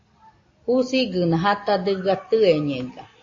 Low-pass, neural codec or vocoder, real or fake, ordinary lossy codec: 7.2 kHz; none; real; AAC, 32 kbps